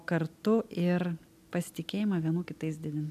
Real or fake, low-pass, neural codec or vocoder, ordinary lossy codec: real; 14.4 kHz; none; MP3, 96 kbps